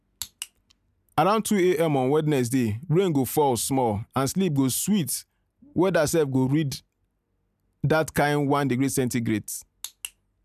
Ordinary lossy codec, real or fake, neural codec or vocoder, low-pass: none; real; none; 14.4 kHz